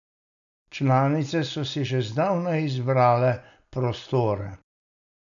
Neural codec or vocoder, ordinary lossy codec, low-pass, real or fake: none; none; 7.2 kHz; real